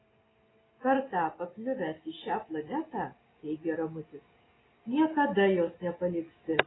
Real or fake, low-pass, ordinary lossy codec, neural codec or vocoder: real; 7.2 kHz; AAC, 16 kbps; none